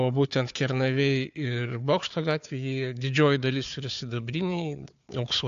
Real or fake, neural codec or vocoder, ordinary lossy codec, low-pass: fake; codec, 16 kHz, 4 kbps, FunCodec, trained on Chinese and English, 50 frames a second; AAC, 64 kbps; 7.2 kHz